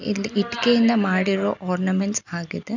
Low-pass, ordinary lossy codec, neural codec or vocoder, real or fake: 7.2 kHz; none; none; real